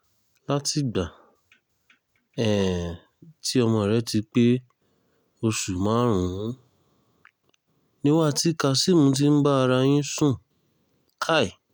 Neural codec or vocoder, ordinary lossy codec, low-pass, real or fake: none; none; none; real